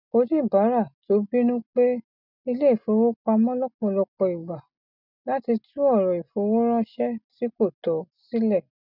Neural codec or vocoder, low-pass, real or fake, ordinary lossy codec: none; 5.4 kHz; real; none